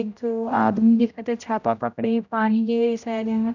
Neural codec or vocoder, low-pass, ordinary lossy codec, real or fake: codec, 16 kHz, 0.5 kbps, X-Codec, HuBERT features, trained on general audio; 7.2 kHz; none; fake